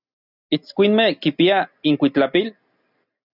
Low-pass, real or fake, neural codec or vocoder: 5.4 kHz; real; none